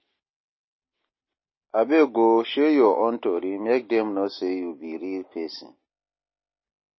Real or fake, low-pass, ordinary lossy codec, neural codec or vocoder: real; 7.2 kHz; MP3, 24 kbps; none